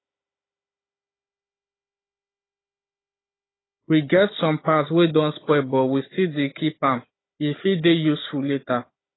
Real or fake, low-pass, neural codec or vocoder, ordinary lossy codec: fake; 7.2 kHz; codec, 16 kHz, 16 kbps, FunCodec, trained on Chinese and English, 50 frames a second; AAC, 16 kbps